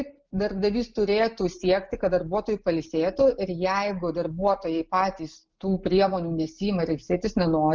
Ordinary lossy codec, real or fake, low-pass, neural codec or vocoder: Opus, 32 kbps; real; 7.2 kHz; none